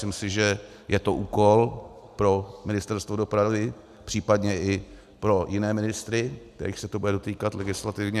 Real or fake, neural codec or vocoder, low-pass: real; none; 14.4 kHz